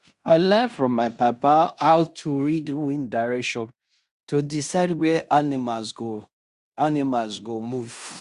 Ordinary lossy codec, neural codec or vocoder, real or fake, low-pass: Opus, 64 kbps; codec, 16 kHz in and 24 kHz out, 0.9 kbps, LongCat-Audio-Codec, fine tuned four codebook decoder; fake; 10.8 kHz